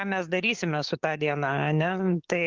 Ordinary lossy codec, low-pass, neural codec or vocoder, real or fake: Opus, 16 kbps; 7.2 kHz; codec, 44.1 kHz, 7.8 kbps, DAC; fake